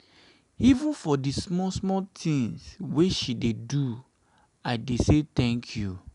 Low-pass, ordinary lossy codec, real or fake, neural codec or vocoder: 10.8 kHz; none; real; none